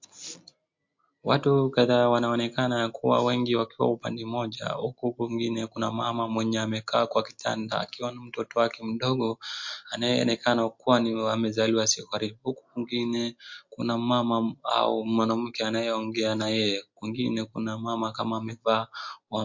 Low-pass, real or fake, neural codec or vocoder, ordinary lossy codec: 7.2 kHz; real; none; MP3, 48 kbps